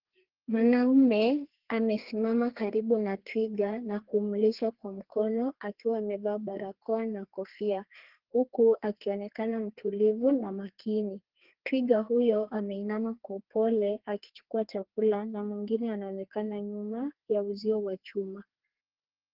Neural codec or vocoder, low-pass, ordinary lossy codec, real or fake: codec, 32 kHz, 1.9 kbps, SNAC; 5.4 kHz; Opus, 16 kbps; fake